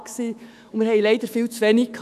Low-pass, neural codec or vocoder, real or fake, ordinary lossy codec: 14.4 kHz; autoencoder, 48 kHz, 128 numbers a frame, DAC-VAE, trained on Japanese speech; fake; none